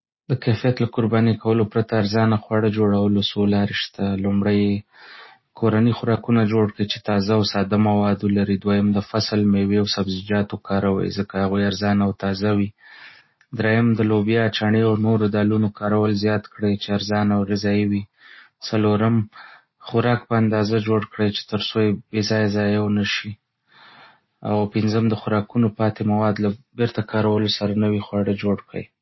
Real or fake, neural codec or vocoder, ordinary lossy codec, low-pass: real; none; MP3, 24 kbps; 7.2 kHz